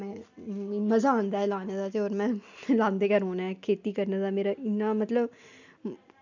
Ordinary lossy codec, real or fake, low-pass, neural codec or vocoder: none; real; 7.2 kHz; none